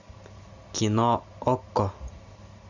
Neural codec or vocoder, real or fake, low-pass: none; real; 7.2 kHz